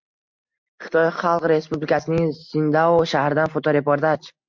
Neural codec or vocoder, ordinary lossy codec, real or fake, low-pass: none; MP3, 64 kbps; real; 7.2 kHz